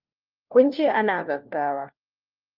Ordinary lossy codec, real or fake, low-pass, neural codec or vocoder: Opus, 24 kbps; fake; 5.4 kHz; codec, 16 kHz, 1 kbps, FunCodec, trained on LibriTTS, 50 frames a second